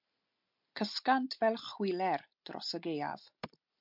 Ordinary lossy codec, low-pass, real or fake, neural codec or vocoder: MP3, 48 kbps; 5.4 kHz; real; none